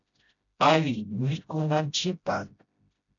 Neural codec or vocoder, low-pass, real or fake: codec, 16 kHz, 0.5 kbps, FreqCodec, smaller model; 7.2 kHz; fake